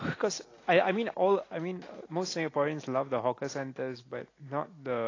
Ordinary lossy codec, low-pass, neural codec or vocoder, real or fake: AAC, 32 kbps; 7.2 kHz; none; real